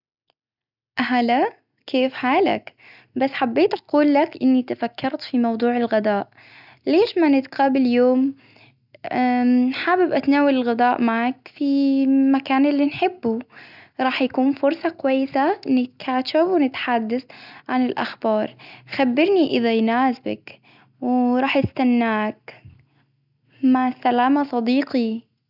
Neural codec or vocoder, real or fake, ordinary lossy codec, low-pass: none; real; none; 5.4 kHz